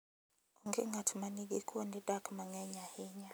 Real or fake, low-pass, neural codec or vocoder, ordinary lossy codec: real; none; none; none